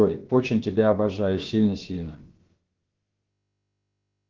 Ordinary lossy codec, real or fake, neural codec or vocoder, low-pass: Opus, 16 kbps; fake; codec, 16 kHz, about 1 kbps, DyCAST, with the encoder's durations; 7.2 kHz